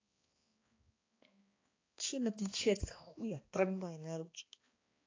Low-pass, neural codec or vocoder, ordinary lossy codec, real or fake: 7.2 kHz; codec, 16 kHz, 2 kbps, X-Codec, HuBERT features, trained on balanced general audio; AAC, 32 kbps; fake